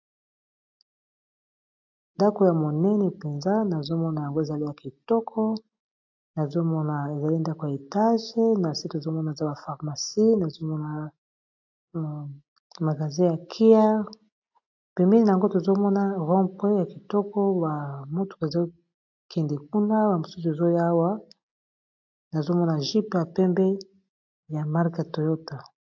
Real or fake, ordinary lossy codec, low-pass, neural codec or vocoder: real; AAC, 48 kbps; 7.2 kHz; none